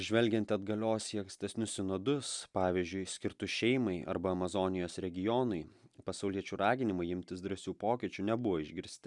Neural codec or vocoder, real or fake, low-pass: none; real; 10.8 kHz